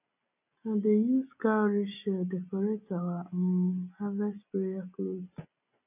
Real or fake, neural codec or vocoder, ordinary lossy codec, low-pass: real; none; none; 3.6 kHz